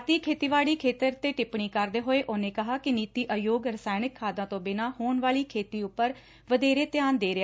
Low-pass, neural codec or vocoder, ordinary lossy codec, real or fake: none; none; none; real